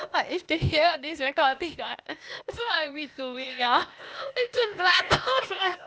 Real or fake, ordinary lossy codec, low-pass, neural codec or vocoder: fake; none; none; codec, 16 kHz, 0.8 kbps, ZipCodec